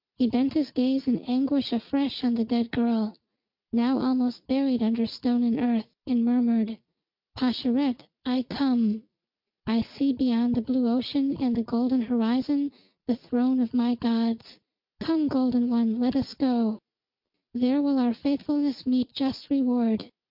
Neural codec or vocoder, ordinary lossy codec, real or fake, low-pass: none; MP3, 48 kbps; real; 5.4 kHz